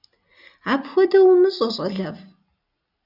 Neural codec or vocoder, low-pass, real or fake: none; 5.4 kHz; real